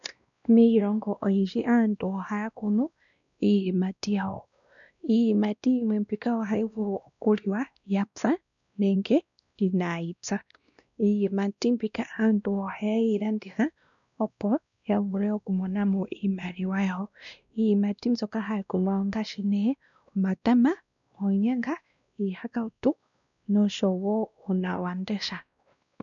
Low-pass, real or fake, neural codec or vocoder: 7.2 kHz; fake; codec, 16 kHz, 1 kbps, X-Codec, WavLM features, trained on Multilingual LibriSpeech